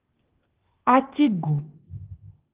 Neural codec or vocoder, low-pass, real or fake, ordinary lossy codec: codec, 16 kHz, 0.8 kbps, ZipCodec; 3.6 kHz; fake; Opus, 16 kbps